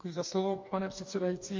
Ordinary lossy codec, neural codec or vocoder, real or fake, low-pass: MP3, 48 kbps; codec, 44.1 kHz, 2.6 kbps, DAC; fake; 7.2 kHz